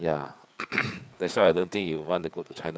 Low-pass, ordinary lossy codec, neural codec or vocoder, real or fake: none; none; codec, 16 kHz, 4 kbps, FreqCodec, larger model; fake